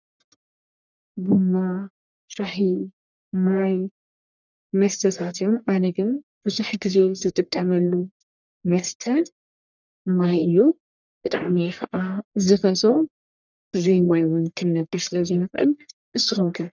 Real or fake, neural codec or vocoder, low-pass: fake; codec, 44.1 kHz, 1.7 kbps, Pupu-Codec; 7.2 kHz